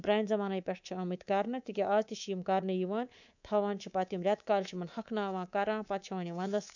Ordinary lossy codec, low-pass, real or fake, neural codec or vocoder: none; 7.2 kHz; fake; codec, 24 kHz, 3.1 kbps, DualCodec